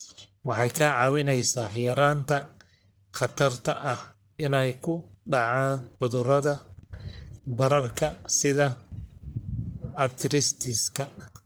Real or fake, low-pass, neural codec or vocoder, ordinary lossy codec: fake; none; codec, 44.1 kHz, 1.7 kbps, Pupu-Codec; none